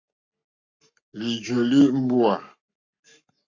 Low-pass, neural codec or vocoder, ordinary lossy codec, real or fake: 7.2 kHz; codec, 44.1 kHz, 7.8 kbps, Pupu-Codec; MP3, 48 kbps; fake